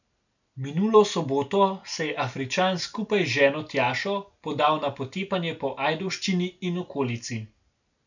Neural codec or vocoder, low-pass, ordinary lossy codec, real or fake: none; 7.2 kHz; none; real